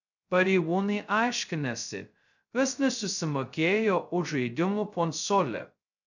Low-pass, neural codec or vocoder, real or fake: 7.2 kHz; codec, 16 kHz, 0.2 kbps, FocalCodec; fake